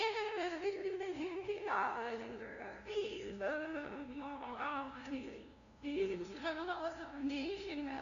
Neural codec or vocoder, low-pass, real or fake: codec, 16 kHz, 0.5 kbps, FunCodec, trained on LibriTTS, 25 frames a second; 7.2 kHz; fake